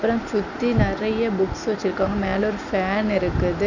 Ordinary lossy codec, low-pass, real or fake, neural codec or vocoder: none; 7.2 kHz; real; none